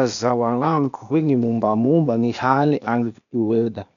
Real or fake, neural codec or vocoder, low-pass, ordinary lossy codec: fake; codec, 16 kHz, 0.8 kbps, ZipCodec; 7.2 kHz; none